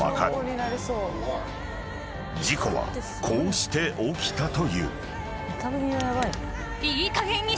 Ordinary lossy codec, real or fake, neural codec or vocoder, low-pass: none; real; none; none